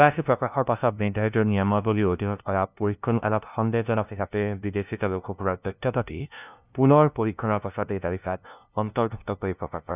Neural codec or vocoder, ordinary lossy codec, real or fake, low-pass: codec, 16 kHz, 0.5 kbps, FunCodec, trained on LibriTTS, 25 frames a second; none; fake; 3.6 kHz